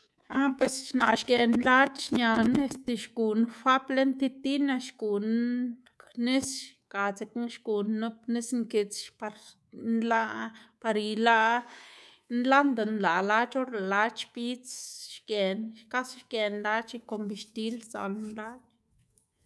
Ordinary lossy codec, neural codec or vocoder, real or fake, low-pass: none; codec, 24 kHz, 3.1 kbps, DualCodec; fake; none